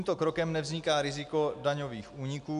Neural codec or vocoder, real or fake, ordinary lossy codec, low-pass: none; real; MP3, 96 kbps; 10.8 kHz